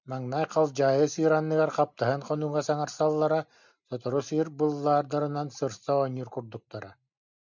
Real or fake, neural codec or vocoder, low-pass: real; none; 7.2 kHz